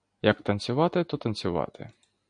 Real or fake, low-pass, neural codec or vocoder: real; 9.9 kHz; none